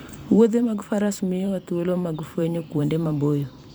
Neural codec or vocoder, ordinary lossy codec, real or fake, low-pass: vocoder, 44.1 kHz, 128 mel bands every 512 samples, BigVGAN v2; none; fake; none